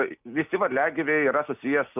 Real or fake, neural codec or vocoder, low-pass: real; none; 3.6 kHz